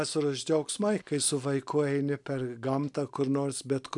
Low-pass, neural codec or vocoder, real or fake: 10.8 kHz; none; real